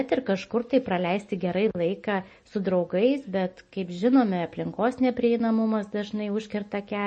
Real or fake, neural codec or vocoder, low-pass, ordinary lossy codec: real; none; 10.8 kHz; MP3, 32 kbps